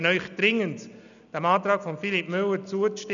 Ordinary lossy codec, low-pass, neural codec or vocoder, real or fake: none; 7.2 kHz; none; real